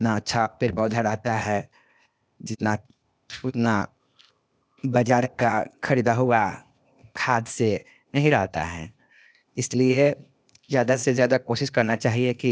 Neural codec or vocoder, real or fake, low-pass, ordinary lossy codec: codec, 16 kHz, 0.8 kbps, ZipCodec; fake; none; none